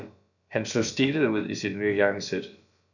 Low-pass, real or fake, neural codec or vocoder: 7.2 kHz; fake; codec, 16 kHz, about 1 kbps, DyCAST, with the encoder's durations